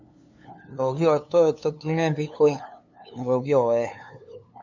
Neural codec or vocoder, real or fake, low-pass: codec, 16 kHz, 2 kbps, FunCodec, trained on LibriTTS, 25 frames a second; fake; 7.2 kHz